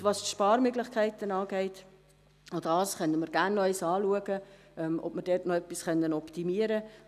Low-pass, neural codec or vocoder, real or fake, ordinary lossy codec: 14.4 kHz; none; real; none